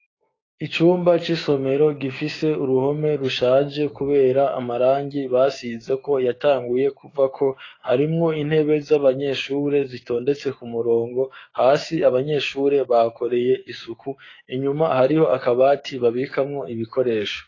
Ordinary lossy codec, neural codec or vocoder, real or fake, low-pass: AAC, 32 kbps; codec, 16 kHz, 6 kbps, DAC; fake; 7.2 kHz